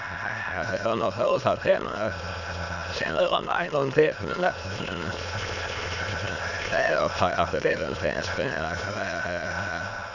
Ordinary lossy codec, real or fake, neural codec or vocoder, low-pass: none; fake; autoencoder, 22.05 kHz, a latent of 192 numbers a frame, VITS, trained on many speakers; 7.2 kHz